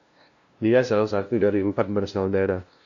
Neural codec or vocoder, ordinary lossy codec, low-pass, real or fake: codec, 16 kHz, 0.5 kbps, FunCodec, trained on LibriTTS, 25 frames a second; AAC, 48 kbps; 7.2 kHz; fake